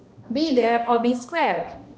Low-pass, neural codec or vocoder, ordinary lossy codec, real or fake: none; codec, 16 kHz, 1 kbps, X-Codec, HuBERT features, trained on balanced general audio; none; fake